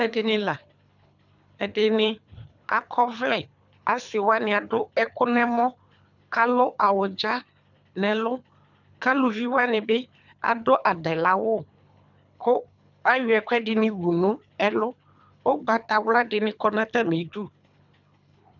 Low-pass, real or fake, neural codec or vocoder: 7.2 kHz; fake; codec, 24 kHz, 3 kbps, HILCodec